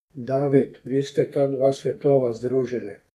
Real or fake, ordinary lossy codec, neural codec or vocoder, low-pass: fake; none; codec, 32 kHz, 1.9 kbps, SNAC; 14.4 kHz